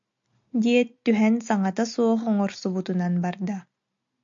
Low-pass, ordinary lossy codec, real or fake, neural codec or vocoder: 7.2 kHz; AAC, 64 kbps; real; none